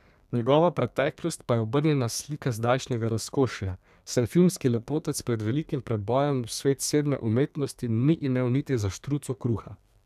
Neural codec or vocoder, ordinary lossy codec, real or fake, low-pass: codec, 32 kHz, 1.9 kbps, SNAC; none; fake; 14.4 kHz